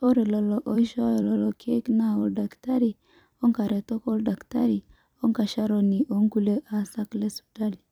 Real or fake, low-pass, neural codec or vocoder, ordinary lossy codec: fake; 19.8 kHz; vocoder, 44.1 kHz, 128 mel bands every 256 samples, BigVGAN v2; none